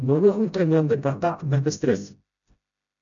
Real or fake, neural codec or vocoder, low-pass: fake; codec, 16 kHz, 0.5 kbps, FreqCodec, smaller model; 7.2 kHz